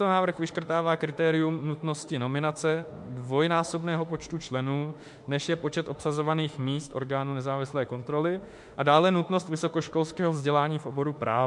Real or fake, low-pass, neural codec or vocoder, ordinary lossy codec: fake; 10.8 kHz; autoencoder, 48 kHz, 32 numbers a frame, DAC-VAE, trained on Japanese speech; MP3, 64 kbps